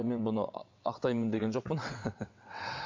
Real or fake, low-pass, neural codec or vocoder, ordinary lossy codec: fake; 7.2 kHz; vocoder, 22.05 kHz, 80 mel bands, WaveNeXt; MP3, 48 kbps